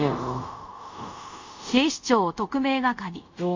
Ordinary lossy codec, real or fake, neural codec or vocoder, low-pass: MP3, 64 kbps; fake; codec, 24 kHz, 0.5 kbps, DualCodec; 7.2 kHz